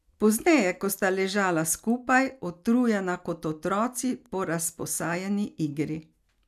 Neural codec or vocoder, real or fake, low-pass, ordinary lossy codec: none; real; 14.4 kHz; none